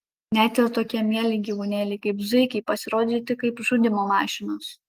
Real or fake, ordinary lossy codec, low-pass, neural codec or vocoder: real; Opus, 32 kbps; 14.4 kHz; none